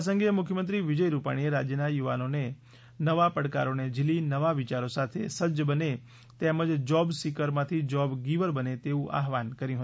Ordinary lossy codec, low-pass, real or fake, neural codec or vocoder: none; none; real; none